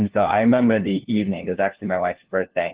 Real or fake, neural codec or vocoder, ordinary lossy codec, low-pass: fake; codec, 16 kHz, 1 kbps, FunCodec, trained on LibriTTS, 50 frames a second; Opus, 16 kbps; 3.6 kHz